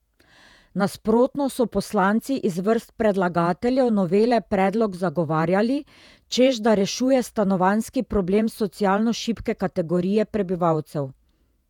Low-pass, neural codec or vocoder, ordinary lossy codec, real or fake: 19.8 kHz; vocoder, 48 kHz, 128 mel bands, Vocos; none; fake